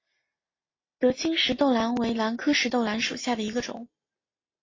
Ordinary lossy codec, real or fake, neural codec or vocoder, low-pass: AAC, 32 kbps; real; none; 7.2 kHz